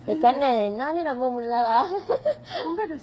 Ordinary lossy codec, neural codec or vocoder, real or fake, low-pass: none; codec, 16 kHz, 4 kbps, FreqCodec, smaller model; fake; none